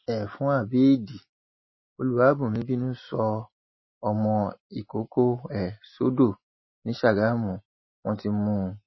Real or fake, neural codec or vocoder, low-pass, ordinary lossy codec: real; none; 7.2 kHz; MP3, 24 kbps